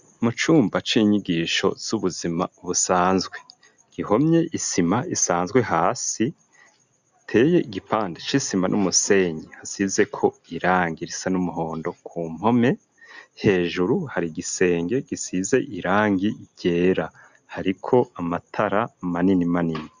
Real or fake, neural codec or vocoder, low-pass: real; none; 7.2 kHz